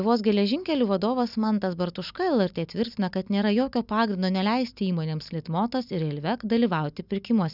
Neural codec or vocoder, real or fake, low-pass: none; real; 5.4 kHz